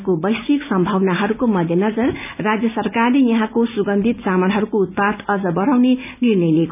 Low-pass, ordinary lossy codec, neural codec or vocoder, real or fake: 3.6 kHz; none; none; real